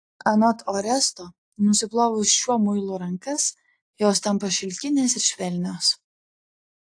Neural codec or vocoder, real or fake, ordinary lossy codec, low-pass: none; real; AAC, 48 kbps; 9.9 kHz